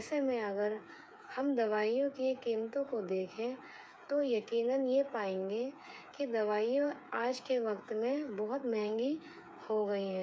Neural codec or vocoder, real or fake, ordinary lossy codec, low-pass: codec, 16 kHz, 8 kbps, FreqCodec, smaller model; fake; none; none